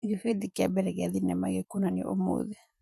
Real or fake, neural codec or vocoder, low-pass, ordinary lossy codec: fake; vocoder, 48 kHz, 128 mel bands, Vocos; 14.4 kHz; none